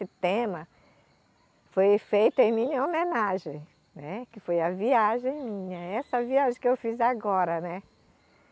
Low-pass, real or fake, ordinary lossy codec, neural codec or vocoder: none; real; none; none